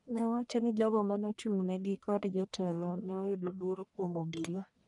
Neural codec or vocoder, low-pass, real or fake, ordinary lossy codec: codec, 44.1 kHz, 1.7 kbps, Pupu-Codec; 10.8 kHz; fake; none